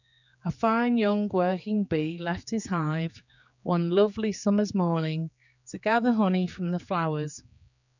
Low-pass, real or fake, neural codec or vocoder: 7.2 kHz; fake; codec, 16 kHz, 4 kbps, X-Codec, HuBERT features, trained on general audio